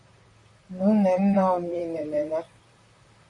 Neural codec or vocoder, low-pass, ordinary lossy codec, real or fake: vocoder, 44.1 kHz, 128 mel bands, Pupu-Vocoder; 10.8 kHz; MP3, 48 kbps; fake